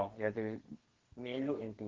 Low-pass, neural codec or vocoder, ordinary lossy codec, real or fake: 7.2 kHz; codec, 32 kHz, 1.9 kbps, SNAC; Opus, 16 kbps; fake